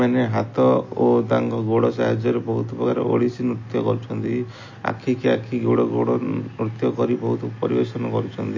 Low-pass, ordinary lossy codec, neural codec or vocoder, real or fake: 7.2 kHz; MP3, 32 kbps; none; real